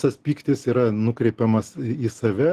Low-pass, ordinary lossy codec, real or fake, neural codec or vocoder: 14.4 kHz; Opus, 16 kbps; real; none